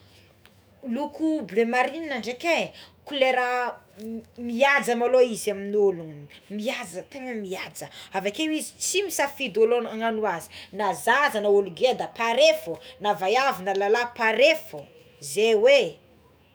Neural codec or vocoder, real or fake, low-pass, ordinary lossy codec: autoencoder, 48 kHz, 128 numbers a frame, DAC-VAE, trained on Japanese speech; fake; none; none